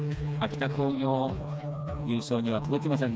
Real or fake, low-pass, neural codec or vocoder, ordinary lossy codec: fake; none; codec, 16 kHz, 2 kbps, FreqCodec, smaller model; none